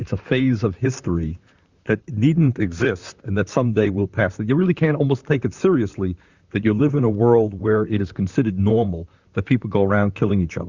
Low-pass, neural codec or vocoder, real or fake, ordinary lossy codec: 7.2 kHz; vocoder, 44.1 kHz, 128 mel bands, Pupu-Vocoder; fake; Opus, 64 kbps